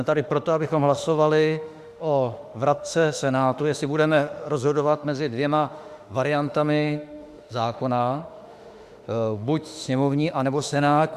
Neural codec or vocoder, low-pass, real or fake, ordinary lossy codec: autoencoder, 48 kHz, 32 numbers a frame, DAC-VAE, trained on Japanese speech; 14.4 kHz; fake; Opus, 64 kbps